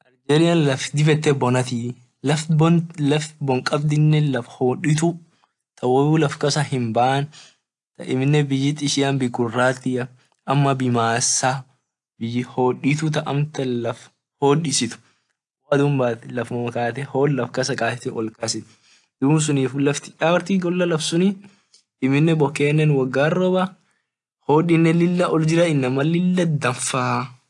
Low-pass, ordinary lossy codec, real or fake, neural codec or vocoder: 10.8 kHz; AAC, 64 kbps; real; none